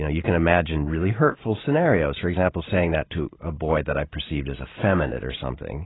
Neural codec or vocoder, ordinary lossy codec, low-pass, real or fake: vocoder, 44.1 kHz, 128 mel bands every 512 samples, BigVGAN v2; AAC, 16 kbps; 7.2 kHz; fake